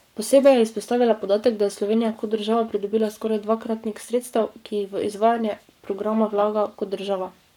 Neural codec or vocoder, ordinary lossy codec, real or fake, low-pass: codec, 44.1 kHz, 7.8 kbps, Pupu-Codec; none; fake; 19.8 kHz